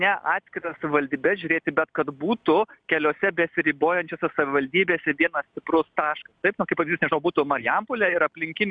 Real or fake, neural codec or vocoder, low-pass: real; none; 9.9 kHz